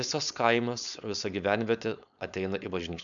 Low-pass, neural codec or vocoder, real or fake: 7.2 kHz; codec, 16 kHz, 4.8 kbps, FACodec; fake